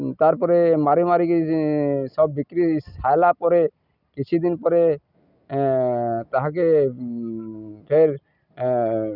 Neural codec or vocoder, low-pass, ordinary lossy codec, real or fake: none; 5.4 kHz; none; real